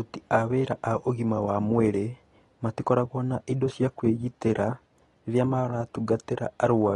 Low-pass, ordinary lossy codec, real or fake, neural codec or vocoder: 10.8 kHz; AAC, 32 kbps; real; none